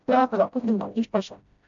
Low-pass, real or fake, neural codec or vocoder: 7.2 kHz; fake; codec, 16 kHz, 0.5 kbps, FreqCodec, smaller model